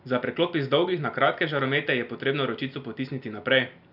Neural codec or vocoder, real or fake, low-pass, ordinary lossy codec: none; real; 5.4 kHz; none